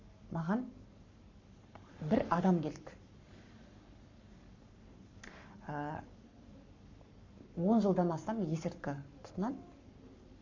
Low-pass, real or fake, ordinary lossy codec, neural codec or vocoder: 7.2 kHz; fake; MP3, 64 kbps; codec, 44.1 kHz, 7.8 kbps, Pupu-Codec